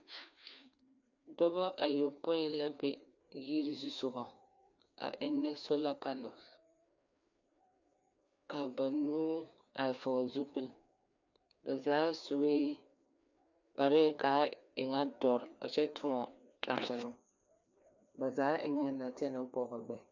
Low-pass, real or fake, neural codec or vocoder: 7.2 kHz; fake; codec, 16 kHz, 2 kbps, FreqCodec, larger model